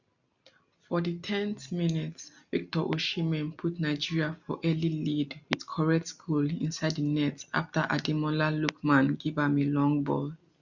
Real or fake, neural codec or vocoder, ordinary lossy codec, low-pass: real; none; none; 7.2 kHz